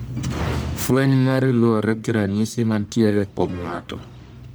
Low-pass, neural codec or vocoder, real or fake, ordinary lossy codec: none; codec, 44.1 kHz, 1.7 kbps, Pupu-Codec; fake; none